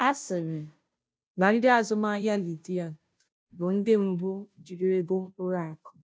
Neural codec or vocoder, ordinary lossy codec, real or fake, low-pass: codec, 16 kHz, 0.5 kbps, FunCodec, trained on Chinese and English, 25 frames a second; none; fake; none